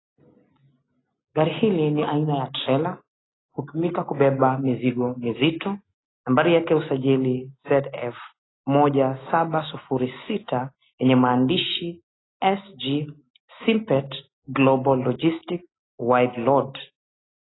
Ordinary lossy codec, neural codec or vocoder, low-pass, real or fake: AAC, 16 kbps; none; 7.2 kHz; real